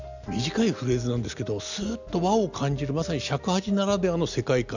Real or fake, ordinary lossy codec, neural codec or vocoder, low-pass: real; none; none; 7.2 kHz